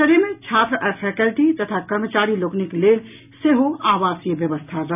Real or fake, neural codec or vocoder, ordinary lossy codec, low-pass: real; none; none; 3.6 kHz